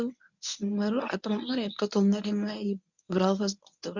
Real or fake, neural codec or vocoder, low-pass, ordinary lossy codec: fake; codec, 24 kHz, 0.9 kbps, WavTokenizer, medium speech release version 1; 7.2 kHz; none